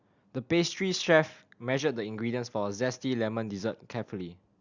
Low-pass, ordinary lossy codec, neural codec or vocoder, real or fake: 7.2 kHz; Opus, 64 kbps; none; real